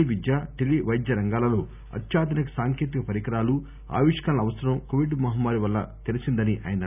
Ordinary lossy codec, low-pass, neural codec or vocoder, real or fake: none; 3.6 kHz; none; real